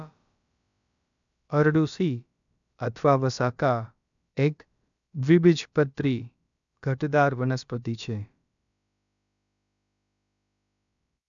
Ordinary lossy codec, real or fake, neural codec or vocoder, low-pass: none; fake; codec, 16 kHz, about 1 kbps, DyCAST, with the encoder's durations; 7.2 kHz